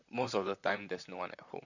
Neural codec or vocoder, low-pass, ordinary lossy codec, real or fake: vocoder, 44.1 kHz, 128 mel bands, Pupu-Vocoder; 7.2 kHz; none; fake